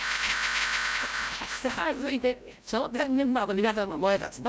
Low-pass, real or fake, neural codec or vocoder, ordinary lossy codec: none; fake; codec, 16 kHz, 0.5 kbps, FreqCodec, larger model; none